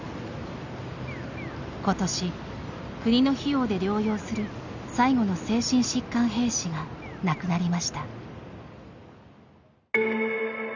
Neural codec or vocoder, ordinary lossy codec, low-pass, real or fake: none; none; 7.2 kHz; real